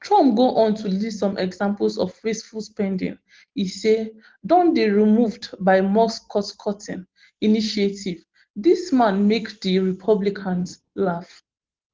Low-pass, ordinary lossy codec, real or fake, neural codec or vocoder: 7.2 kHz; Opus, 16 kbps; real; none